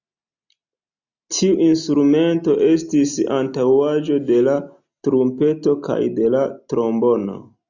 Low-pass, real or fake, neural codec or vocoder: 7.2 kHz; real; none